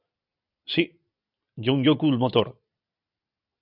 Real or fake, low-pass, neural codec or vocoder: real; 5.4 kHz; none